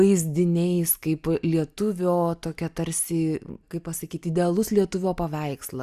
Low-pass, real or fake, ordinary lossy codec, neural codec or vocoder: 14.4 kHz; real; Opus, 64 kbps; none